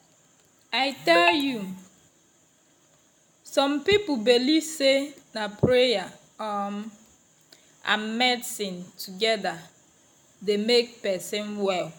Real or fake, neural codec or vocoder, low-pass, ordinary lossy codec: real; none; none; none